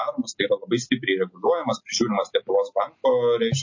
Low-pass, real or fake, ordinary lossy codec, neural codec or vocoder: 7.2 kHz; real; MP3, 32 kbps; none